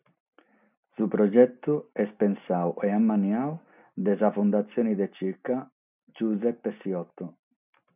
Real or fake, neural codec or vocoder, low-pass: real; none; 3.6 kHz